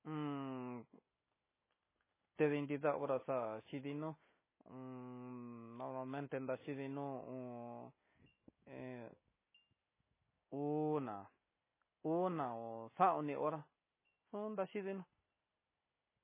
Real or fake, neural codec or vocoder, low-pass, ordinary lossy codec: real; none; 3.6 kHz; MP3, 16 kbps